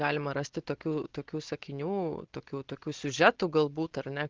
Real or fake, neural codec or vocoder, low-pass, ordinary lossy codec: real; none; 7.2 kHz; Opus, 16 kbps